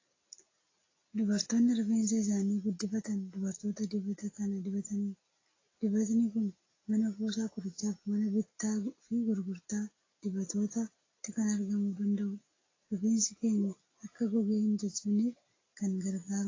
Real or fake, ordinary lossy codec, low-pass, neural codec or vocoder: real; AAC, 32 kbps; 7.2 kHz; none